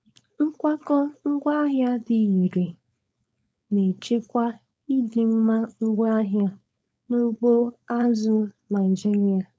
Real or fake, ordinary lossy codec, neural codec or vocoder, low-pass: fake; none; codec, 16 kHz, 4.8 kbps, FACodec; none